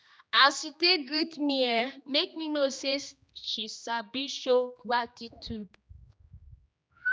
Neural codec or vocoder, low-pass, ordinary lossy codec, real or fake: codec, 16 kHz, 2 kbps, X-Codec, HuBERT features, trained on general audio; none; none; fake